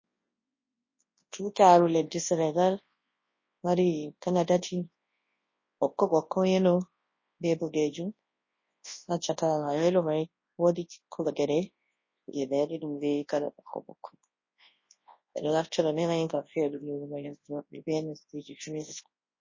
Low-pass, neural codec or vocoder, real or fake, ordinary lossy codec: 7.2 kHz; codec, 24 kHz, 0.9 kbps, WavTokenizer, large speech release; fake; MP3, 32 kbps